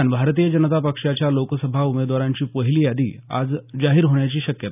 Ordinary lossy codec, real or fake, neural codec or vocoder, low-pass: none; real; none; 3.6 kHz